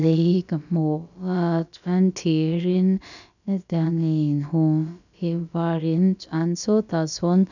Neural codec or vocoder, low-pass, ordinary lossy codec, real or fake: codec, 16 kHz, about 1 kbps, DyCAST, with the encoder's durations; 7.2 kHz; none; fake